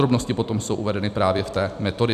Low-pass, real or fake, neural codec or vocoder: 14.4 kHz; fake; vocoder, 44.1 kHz, 128 mel bands every 256 samples, BigVGAN v2